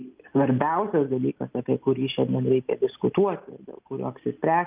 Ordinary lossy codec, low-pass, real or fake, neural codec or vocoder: Opus, 24 kbps; 3.6 kHz; real; none